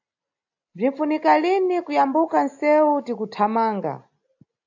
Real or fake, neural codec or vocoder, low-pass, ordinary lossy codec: real; none; 7.2 kHz; AAC, 48 kbps